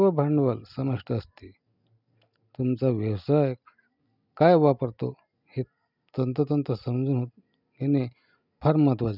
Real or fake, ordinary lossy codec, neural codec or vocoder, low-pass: real; none; none; 5.4 kHz